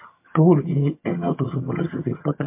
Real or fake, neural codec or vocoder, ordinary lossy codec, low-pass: fake; vocoder, 22.05 kHz, 80 mel bands, HiFi-GAN; MP3, 24 kbps; 3.6 kHz